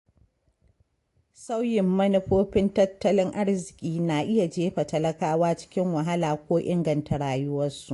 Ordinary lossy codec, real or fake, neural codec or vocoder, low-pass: AAC, 48 kbps; real; none; 10.8 kHz